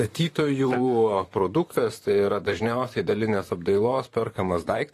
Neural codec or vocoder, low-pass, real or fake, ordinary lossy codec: vocoder, 44.1 kHz, 128 mel bands, Pupu-Vocoder; 14.4 kHz; fake; AAC, 48 kbps